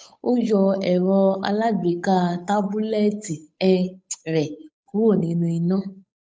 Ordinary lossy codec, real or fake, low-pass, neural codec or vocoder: none; fake; none; codec, 16 kHz, 8 kbps, FunCodec, trained on Chinese and English, 25 frames a second